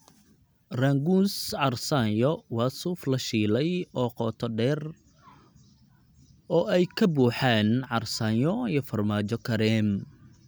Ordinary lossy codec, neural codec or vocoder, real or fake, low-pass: none; none; real; none